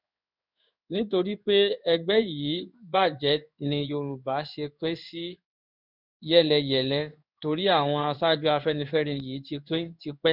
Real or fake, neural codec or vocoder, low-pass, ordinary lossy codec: fake; codec, 16 kHz in and 24 kHz out, 1 kbps, XY-Tokenizer; 5.4 kHz; none